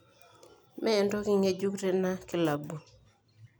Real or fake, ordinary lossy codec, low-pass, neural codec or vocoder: real; none; none; none